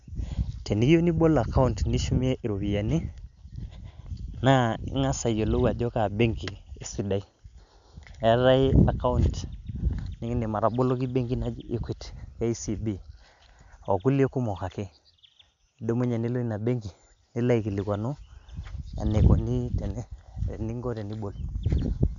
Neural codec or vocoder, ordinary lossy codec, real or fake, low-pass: none; none; real; 7.2 kHz